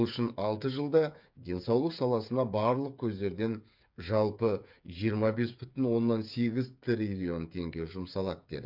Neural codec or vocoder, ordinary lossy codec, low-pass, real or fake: codec, 16 kHz, 8 kbps, FreqCodec, smaller model; MP3, 48 kbps; 5.4 kHz; fake